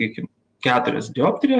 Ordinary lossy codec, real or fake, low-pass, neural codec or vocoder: Opus, 32 kbps; real; 9.9 kHz; none